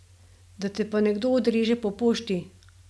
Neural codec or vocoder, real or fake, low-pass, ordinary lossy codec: none; real; none; none